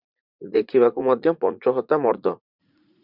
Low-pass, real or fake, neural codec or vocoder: 5.4 kHz; fake; vocoder, 44.1 kHz, 80 mel bands, Vocos